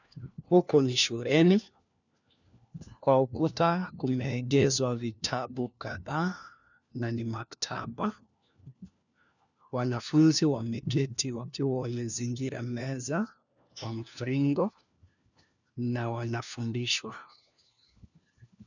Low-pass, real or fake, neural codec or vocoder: 7.2 kHz; fake; codec, 16 kHz, 1 kbps, FunCodec, trained on LibriTTS, 50 frames a second